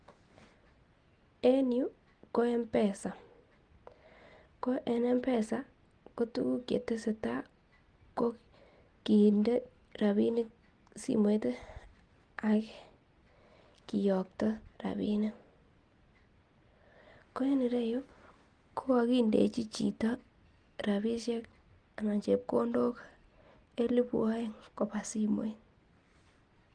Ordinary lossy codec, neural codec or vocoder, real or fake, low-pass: Opus, 24 kbps; none; real; 9.9 kHz